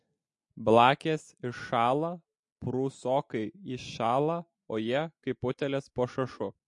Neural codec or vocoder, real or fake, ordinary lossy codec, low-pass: none; real; MP3, 48 kbps; 10.8 kHz